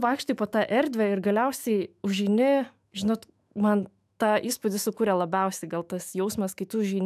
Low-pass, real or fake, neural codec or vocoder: 14.4 kHz; fake; autoencoder, 48 kHz, 128 numbers a frame, DAC-VAE, trained on Japanese speech